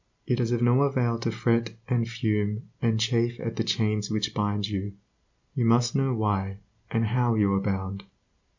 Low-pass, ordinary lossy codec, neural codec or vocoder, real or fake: 7.2 kHz; MP3, 64 kbps; none; real